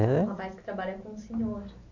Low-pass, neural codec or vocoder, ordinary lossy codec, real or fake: 7.2 kHz; none; none; real